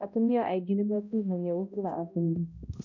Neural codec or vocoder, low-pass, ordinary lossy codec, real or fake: codec, 16 kHz, 0.5 kbps, X-Codec, HuBERT features, trained on balanced general audio; 7.2 kHz; none; fake